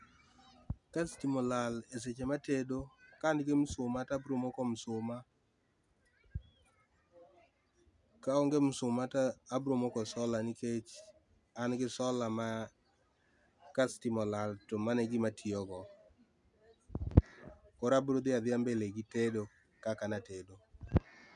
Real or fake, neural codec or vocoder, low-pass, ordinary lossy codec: real; none; 10.8 kHz; none